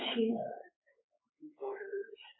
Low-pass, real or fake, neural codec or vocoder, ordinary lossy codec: 7.2 kHz; fake; codec, 16 kHz, 4 kbps, X-Codec, WavLM features, trained on Multilingual LibriSpeech; AAC, 16 kbps